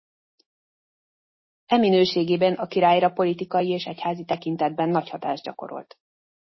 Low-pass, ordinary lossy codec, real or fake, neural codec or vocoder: 7.2 kHz; MP3, 24 kbps; real; none